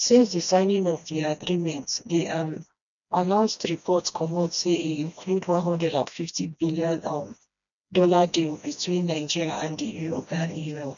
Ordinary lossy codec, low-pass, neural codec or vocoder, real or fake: none; 7.2 kHz; codec, 16 kHz, 1 kbps, FreqCodec, smaller model; fake